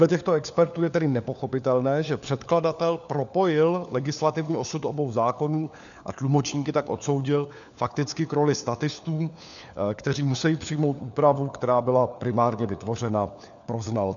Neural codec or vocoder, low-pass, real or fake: codec, 16 kHz, 4 kbps, FunCodec, trained on LibriTTS, 50 frames a second; 7.2 kHz; fake